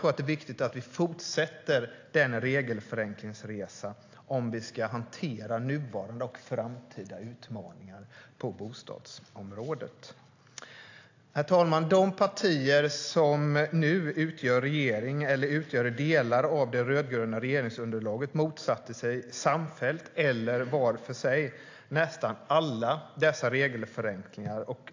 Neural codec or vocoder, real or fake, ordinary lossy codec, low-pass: none; real; AAC, 48 kbps; 7.2 kHz